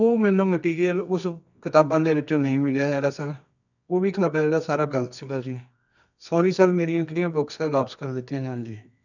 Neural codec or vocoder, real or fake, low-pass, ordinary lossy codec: codec, 24 kHz, 0.9 kbps, WavTokenizer, medium music audio release; fake; 7.2 kHz; none